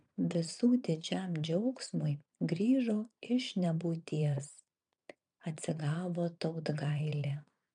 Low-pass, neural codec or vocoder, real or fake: 9.9 kHz; vocoder, 22.05 kHz, 80 mel bands, Vocos; fake